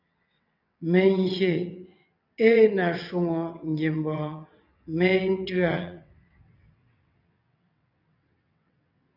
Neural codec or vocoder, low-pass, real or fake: vocoder, 22.05 kHz, 80 mel bands, WaveNeXt; 5.4 kHz; fake